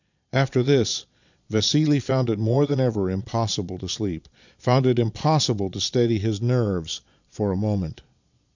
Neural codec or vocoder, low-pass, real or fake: vocoder, 22.05 kHz, 80 mel bands, Vocos; 7.2 kHz; fake